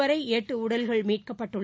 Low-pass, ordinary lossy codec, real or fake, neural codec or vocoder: none; none; real; none